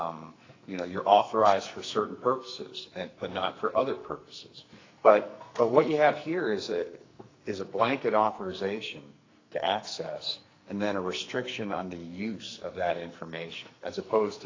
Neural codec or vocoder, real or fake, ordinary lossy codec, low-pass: codec, 44.1 kHz, 2.6 kbps, SNAC; fake; AAC, 32 kbps; 7.2 kHz